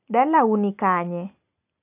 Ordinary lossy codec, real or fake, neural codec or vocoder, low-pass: none; real; none; 3.6 kHz